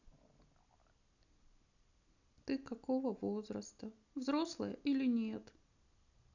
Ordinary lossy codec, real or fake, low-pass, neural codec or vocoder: none; real; 7.2 kHz; none